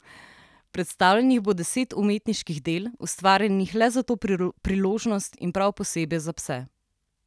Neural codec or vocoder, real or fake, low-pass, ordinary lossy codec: none; real; none; none